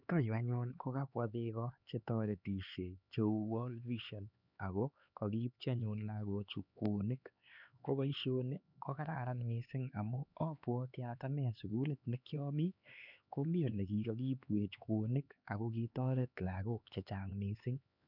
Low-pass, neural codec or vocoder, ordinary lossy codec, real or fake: 5.4 kHz; codec, 16 kHz, 4 kbps, X-Codec, HuBERT features, trained on LibriSpeech; none; fake